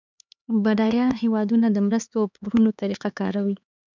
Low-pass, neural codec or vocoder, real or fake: 7.2 kHz; codec, 16 kHz, 4 kbps, X-Codec, HuBERT features, trained on LibriSpeech; fake